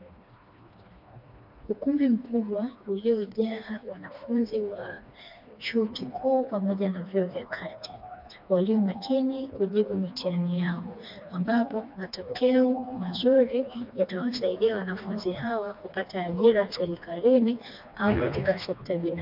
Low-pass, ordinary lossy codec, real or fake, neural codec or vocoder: 5.4 kHz; MP3, 48 kbps; fake; codec, 16 kHz, 2 kbps, FreqCodec, smaller model